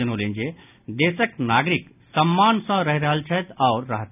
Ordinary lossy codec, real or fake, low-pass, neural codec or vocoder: none; real; 3.6 kHz; none